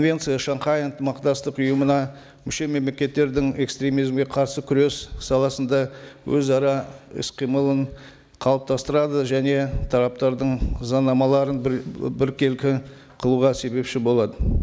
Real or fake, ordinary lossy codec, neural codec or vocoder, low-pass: real; none; none; none